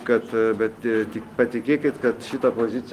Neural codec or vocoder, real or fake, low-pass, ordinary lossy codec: none; real; 14.4 kHz; Opus, 24 kbps